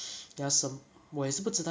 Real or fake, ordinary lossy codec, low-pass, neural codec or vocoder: real; none; none; none